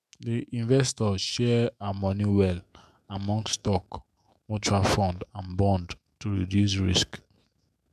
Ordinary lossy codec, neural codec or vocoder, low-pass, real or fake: MP3, 96 kbps; autoencoder, 48 kHz, 128 numbers a frame, DAC-VAE, trained on Japanese speech; 14.4 kHz; fake